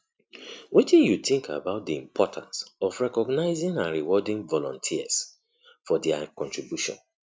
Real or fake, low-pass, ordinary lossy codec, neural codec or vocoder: real; none; none; none